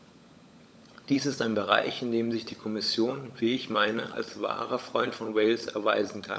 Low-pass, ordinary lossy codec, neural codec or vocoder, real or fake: none; none; codec, 16 kHz, 16 kbps, FunCodec, trained on LibriTTS, 50 frames a second; fake